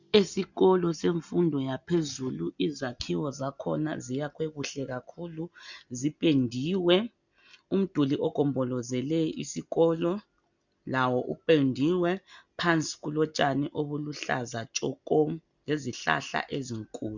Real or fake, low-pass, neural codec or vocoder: real; 7.2 kHz; none